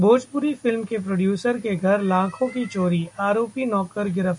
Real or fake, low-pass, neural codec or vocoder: real; 10.8 kHz; none